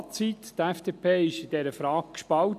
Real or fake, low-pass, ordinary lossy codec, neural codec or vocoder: real; 14.4 kHz; none; none